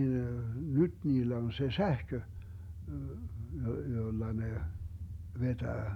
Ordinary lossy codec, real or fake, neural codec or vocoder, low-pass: none; real; none; 19.8 kHz